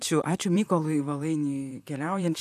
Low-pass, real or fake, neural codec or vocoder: 14.4 kHz; fake; vocoder, 44.1 kHz, 128 mel bands, Pupu-Vocoder